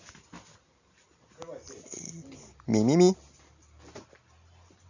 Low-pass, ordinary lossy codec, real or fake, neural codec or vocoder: 7.2 kHz; none; real; none